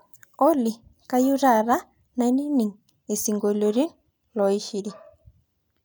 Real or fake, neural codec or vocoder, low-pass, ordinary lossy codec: real; none; none; none